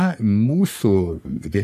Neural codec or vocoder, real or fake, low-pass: codec, 44.1 kHz, 3.4 kbps, Pupu-Codec; fake; 14.4 kHz